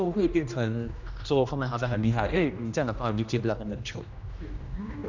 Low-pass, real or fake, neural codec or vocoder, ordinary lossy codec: 7.2 kHz; fake; codec, 16 kHz, 1 kbps, X-Codec, HuBERT features, trained on general audio; none